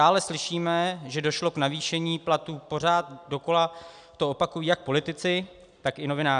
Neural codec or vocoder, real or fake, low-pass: none; real; 9.9 kHz